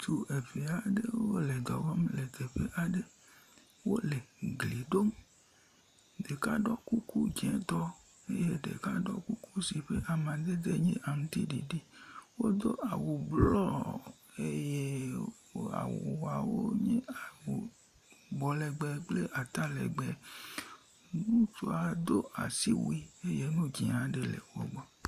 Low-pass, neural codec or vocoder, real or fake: 14.4 kHz; none; real